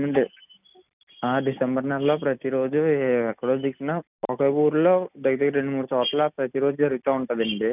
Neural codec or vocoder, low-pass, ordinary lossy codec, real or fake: none; 3.6 kHz; none; real